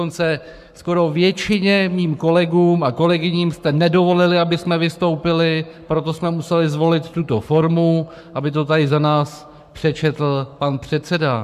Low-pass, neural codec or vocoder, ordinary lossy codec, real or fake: 14.4 kHz; codec, 44.1 kHz, 7.8 kbps, Pupu-Codec; MP3, 96 kbps; fake